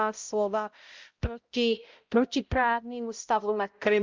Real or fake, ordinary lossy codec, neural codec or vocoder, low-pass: fake; Opus, 32 kbps; codec, 16 kHz, 0.5 kbps, X-Codec, HuBERT features, trained on balanced general audio; 7.2 kHz